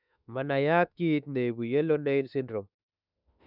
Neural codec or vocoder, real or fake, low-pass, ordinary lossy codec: autoencoder, 48 kHz, 32 numbers a frame, DAC-VAE, trained on Japanese speech; fake; 5.4 kHz; none